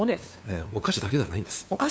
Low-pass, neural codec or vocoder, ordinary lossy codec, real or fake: none; codec, 16 kHz, 2 kbps, FunCodec, trained on LibriTTS, 25 frames a second; none; fake